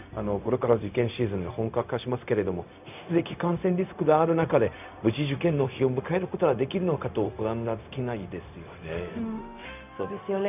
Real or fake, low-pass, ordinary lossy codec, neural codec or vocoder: fake; 3.6 kHz; none; codec, 16 kHz, 0.4 kbps, LongCat-Audio-Codec